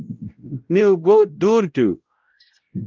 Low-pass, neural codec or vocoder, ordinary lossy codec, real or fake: 7.2 kHz; codec, 16 kHz, 0.5 kbps, X-Codec, WavLM features, trained on Multilingual LibriSpeech; Opus, 32 kbps; fake